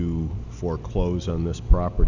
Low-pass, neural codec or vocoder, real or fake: 7.2 kHz; none; real